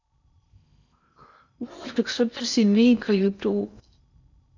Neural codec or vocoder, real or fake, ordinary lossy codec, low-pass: codec, 16 kHz in and 24 kHz out, 0.6 kbps, FocalCodec, streaming, 4096 codes; fake; none; 7.2 kHz